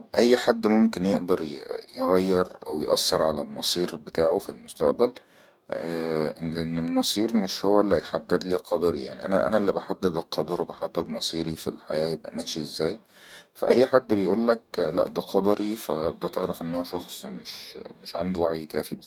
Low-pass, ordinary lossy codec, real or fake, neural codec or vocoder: 19.8 kHz; none; fake; codec, 44.1 kHz, 2.6 kbps, DAC